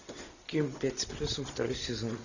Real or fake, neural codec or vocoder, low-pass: real; none; 7.2 kHz